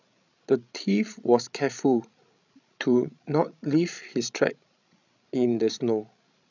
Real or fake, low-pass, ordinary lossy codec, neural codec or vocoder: fake; 7.2 kHz; none; codec, 16 kHz, 16 kbps, FreqCodec, larger model